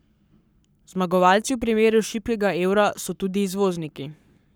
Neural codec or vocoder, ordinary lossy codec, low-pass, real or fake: codec, 44.1 kHz, 7.8 kbps, Pupu-Codec; none; none; fake